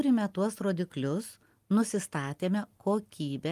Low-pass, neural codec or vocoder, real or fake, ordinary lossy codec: 14.4 kHz; none; real; Opus, 32 kbps